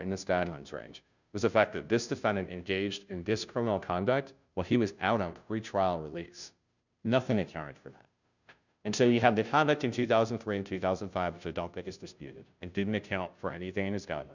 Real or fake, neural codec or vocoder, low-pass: fake; codec, 16 kHz, 0.5 kbps, FunCodec, trained on Chinese and English, 25 frames a second; 7.2 kHz